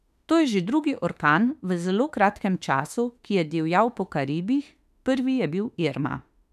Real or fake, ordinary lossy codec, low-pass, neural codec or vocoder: fake; none; 14.4 kHz; autoencoder, 48 kHz, 32 numbers a frame, DAC-VAE, trained on Japanese speech